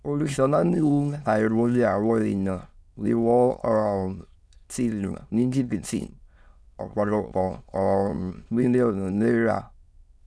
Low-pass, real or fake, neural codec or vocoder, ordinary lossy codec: none; fake; autoencoder, 22.05 kHz, a latent of 192 numbers a frame, VITS, trained on many speakers; none